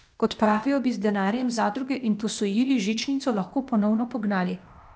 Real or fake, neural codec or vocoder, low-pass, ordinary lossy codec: fake; codec, 16 kHz, 0.8 kbps, ZipCodec; none; none